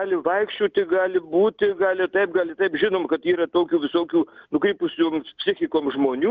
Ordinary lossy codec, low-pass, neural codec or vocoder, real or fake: Opus, 32 kbps; 7.2 kHz; none; real